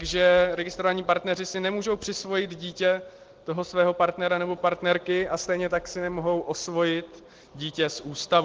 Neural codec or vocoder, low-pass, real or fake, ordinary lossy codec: none; 7.2 kHz; real; Opus, 16 kbps